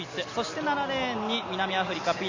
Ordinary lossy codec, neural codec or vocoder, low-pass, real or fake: MP3, 64 kbps; none; 7.2 kHz; real